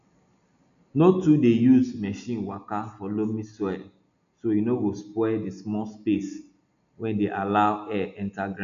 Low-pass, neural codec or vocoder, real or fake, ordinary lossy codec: 7.2 kHz; none; real; none